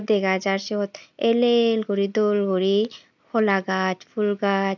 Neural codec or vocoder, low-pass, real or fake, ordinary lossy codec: none; 7.2 kHz; real; none